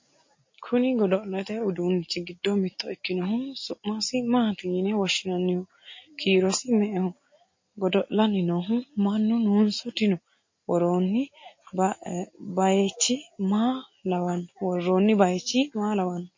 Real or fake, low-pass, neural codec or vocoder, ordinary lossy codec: real; 7.2 kHz; none; MP3, 32 kbps